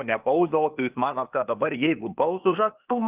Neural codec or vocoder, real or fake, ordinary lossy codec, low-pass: codec, 16 kHz, 0.8 kbps, ZipCodec; fake; Opus, 24 kbps; 3.6 kHz